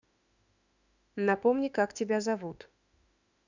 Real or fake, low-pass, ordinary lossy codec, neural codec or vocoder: fake; 7.2 kHz; none; autoencoder, 48 kHz, 32 numbers a frame, DAC-VAE, trained on Japanese speech